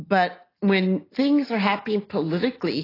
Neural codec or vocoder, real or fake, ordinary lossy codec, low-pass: none; real; AAC, 24 kbps; 5.4 kHz